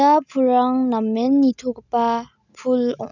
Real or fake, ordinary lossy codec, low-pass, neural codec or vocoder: real; none; 7.2 kHz; none